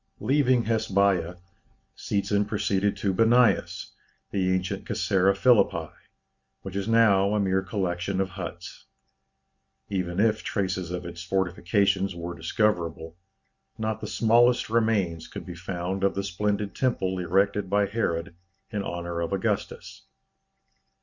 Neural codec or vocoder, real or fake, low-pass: none; real; 7.2 kHz